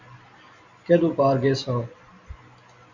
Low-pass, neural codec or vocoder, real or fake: 7.2 kHz; none; real